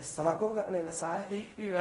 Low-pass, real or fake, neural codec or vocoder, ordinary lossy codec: 10.8 kHz; fake; codec, 16 kHz in and 24 kHz out, 0.4 kbps, LongCat-Audio-Codec, fine tuned four codebook decoder; AAC, 32 kbps